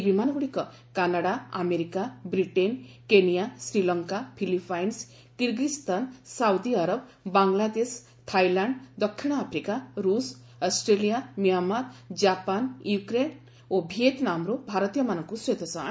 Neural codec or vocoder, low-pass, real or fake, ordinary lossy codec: none; none; real; none